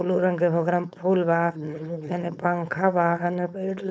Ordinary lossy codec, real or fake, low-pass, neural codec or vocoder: none; fake; none; codec, 16 kHz, 4.8 kbps, FACodec